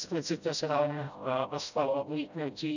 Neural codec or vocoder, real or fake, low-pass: codec, 16 kHz, 0.5 kbps, FreqCodec, smaller model; fake; 7.2 kHz